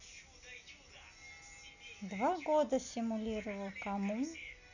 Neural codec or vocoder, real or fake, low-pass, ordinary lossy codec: none; real; 7.2 kHz; none